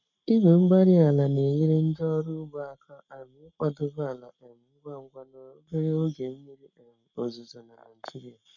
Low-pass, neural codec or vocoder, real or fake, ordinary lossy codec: 7.2 kHz; codec, 44.1 kHz, 7.8 kbps, Pupu-Codec; fake; none